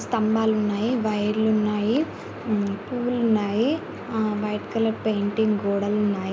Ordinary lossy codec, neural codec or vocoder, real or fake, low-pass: none; none; real; none